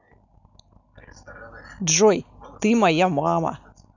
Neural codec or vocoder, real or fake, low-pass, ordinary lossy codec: none; real; 7.2 kHz; none